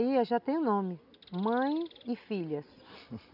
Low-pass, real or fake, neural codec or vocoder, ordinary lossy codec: 5.4 kHz; real; none; none